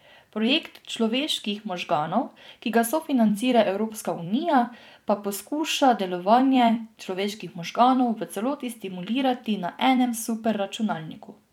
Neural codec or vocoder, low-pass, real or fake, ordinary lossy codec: vocoder, 44.1 kHz, 128 mel bands every 256 samples, BigVGAN v2; 19.8 kHz; fake; none